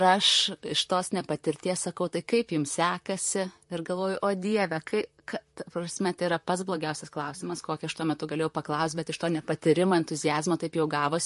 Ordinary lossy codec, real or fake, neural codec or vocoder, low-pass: MP3, 48 kbps; fake; vocoder, 44.1 kHz, 128 mel bands, Pupu-Vocoder; 14.4 kHz